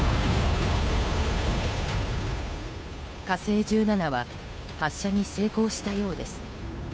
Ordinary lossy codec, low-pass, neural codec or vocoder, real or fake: none; none; codec, 16 kHz, 2 kbps, FunCodec, trained on Chinese and English, 25 frames a second; fake